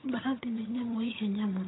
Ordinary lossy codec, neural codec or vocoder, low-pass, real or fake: AAC, 16 kbps; vocoder, 22.05 kHz, 80 mel bands, HiFi-GAN; 7.2 kHz; fake